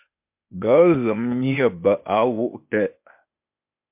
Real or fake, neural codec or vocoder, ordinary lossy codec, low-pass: fake; codec, 16 kHz, 0.8 kbps, ZipCodec; MP3, 32 kbps; 3.6 kHz